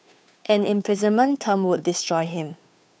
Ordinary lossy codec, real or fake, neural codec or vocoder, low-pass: none; fake; codec, 16 kHz, 2 kbps, FunCodec, trained on Chinese and English, 25 frames a second; none